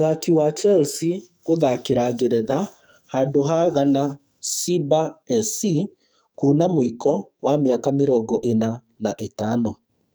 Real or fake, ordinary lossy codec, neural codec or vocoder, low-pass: fake; none; codec, 44.1 kHz, 2.6 kbps, SNAC; none